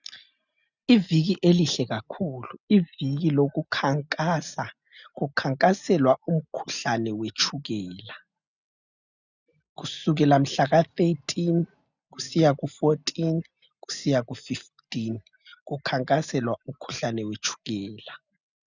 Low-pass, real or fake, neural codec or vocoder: 7.2 kHz; real; none